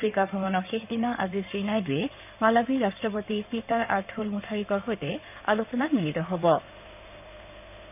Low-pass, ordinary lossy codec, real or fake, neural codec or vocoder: 3.6 kHz; none; fake; codec, 16 kHz in and 24 kHz out, 2.2 kbps, FireRedTTS-2 codec